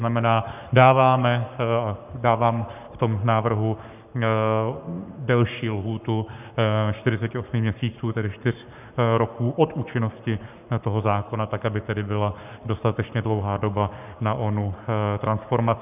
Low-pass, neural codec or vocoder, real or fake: 3.6 kHz; codec, 44.1 kHz, 7.8 kbps, Pupu-Codec; fake